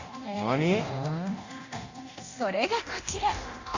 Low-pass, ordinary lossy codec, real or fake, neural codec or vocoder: 7.2 kHz; Opus, 64 kbps; fake; codec, 24 kHz, 0.9 kbps, DualCodec